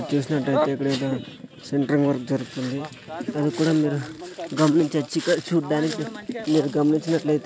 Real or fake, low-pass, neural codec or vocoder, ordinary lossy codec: real; none; none; none